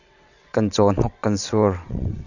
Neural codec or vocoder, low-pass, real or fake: vocoder, 22.05 kHz, 80 mel bands, WaveNeXt; 7.2 kHz; fake